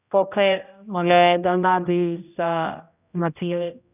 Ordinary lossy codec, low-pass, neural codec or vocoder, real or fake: none; 3.6 kHz; codec, 16 kHz, 0.5 kbps, X-Codec, HuBERT features, trained on general audio; fake